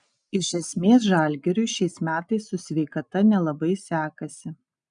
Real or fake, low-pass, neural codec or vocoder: real; 9.9 kHz; none